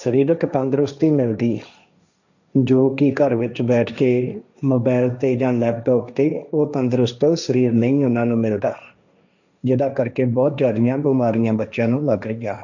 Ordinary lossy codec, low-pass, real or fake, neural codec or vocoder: none; 7.2 kHz; fake; codec, 16 kHz, 1.1 kbps, Voila-Tokenizer